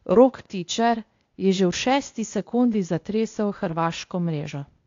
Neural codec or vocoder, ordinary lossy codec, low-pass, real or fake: codec, 16 kHz, 0.8 kbps, ZipCodec; AAC, 48 kbps; 7.2 kHz; fake